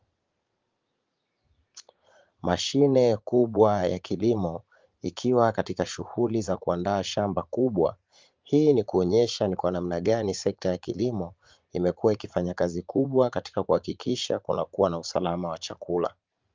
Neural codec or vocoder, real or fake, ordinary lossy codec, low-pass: vocoder, 44.1 kHz, 80 mel bands, Vocos; fake; Opus, 24 kbps; 7.2 kHz